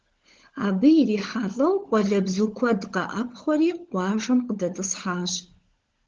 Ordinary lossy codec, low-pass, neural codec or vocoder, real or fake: Opus, 16 kbps; 7.2 kHz; codec, 16 kHz, 16 kbps, FunCodec, trained on LibriTTS, 50 frames a second; fake